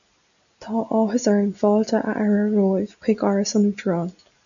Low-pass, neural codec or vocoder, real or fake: 7.2 kHz; none; real